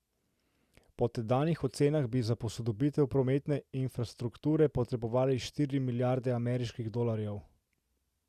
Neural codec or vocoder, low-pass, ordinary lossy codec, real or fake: none; 14.4 kHz; Opus, 64 kbps; real